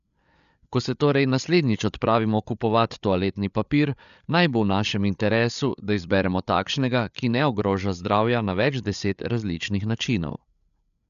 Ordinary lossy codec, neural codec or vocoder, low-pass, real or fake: none; codec, 16 kHz, 8 kbps, FreqCodec, larger model; 7.2 kHz; fake